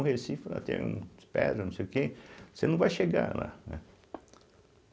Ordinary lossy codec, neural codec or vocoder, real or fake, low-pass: none; none; real; none